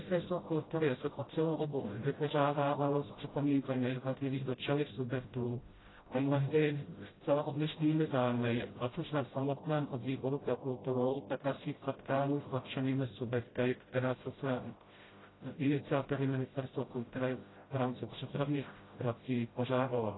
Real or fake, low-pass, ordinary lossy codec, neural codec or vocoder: fake; 7.2 kHz; AAC, 16 kbps; codec, 16 kHz, 0.5 kbps, FreqCodec, smaller model